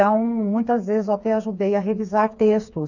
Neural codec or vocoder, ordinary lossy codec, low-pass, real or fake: codec, 16 kHz, 4 kbps, FreqCodec, smaller model; none; 7.2 kHz; fake